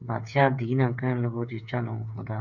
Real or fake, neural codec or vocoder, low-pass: fake; codec, 16 kHz, 8 kbps, FreqCodec, smaller model; 7.2 kHz